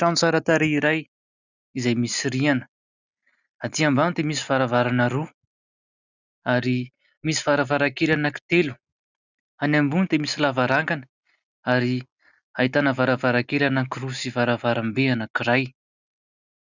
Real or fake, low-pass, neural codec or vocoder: real; 7.2 kHz; none